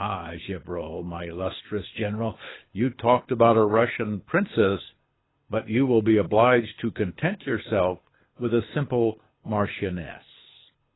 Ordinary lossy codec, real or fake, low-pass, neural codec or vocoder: AAC, 16 kbps; fake; 7.2 kHz; codec, 24 kHz, 6 kbps, HILCodec